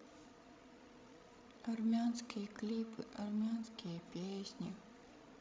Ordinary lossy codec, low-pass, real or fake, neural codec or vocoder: none; none; fake; codec, 16 kHz, 16 kbps, FreqCodec, larger model